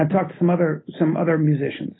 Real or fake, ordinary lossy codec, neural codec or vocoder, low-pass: real; AAC, 16 kbps; none; 7.2 kHz